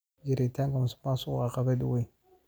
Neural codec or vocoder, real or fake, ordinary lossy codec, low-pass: none; real; none; none